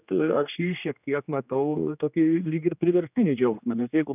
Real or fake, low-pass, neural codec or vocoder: fake; 3.6 kHz; codec, 16 kHz, 2 kbps, X-Codec, HuBERT features, trained on general audio